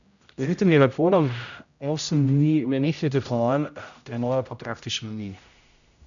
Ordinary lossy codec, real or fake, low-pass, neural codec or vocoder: none; fake; 7.2 kHz; codec, 16 kHz, 0.5 kbps, X-Codec, HuBERT features, trained on general audio